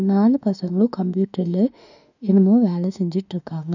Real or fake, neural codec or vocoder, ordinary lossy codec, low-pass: fake; autoencoder, 48 kHz, 32 numbers a frame, DAC-VAE, trained on Japanese speech; none; 7.2 kHz